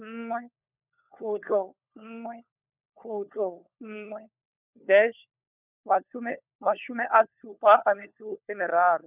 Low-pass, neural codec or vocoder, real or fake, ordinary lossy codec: 3.6 kHz; codec, 16 kHz, 4 kbps, FunCodec, trained on LibriTTS, 50 frames a second; fake; none